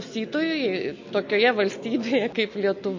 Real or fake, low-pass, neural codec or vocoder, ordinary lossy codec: real; 7.2 kHz; none; MP3, 32 kbps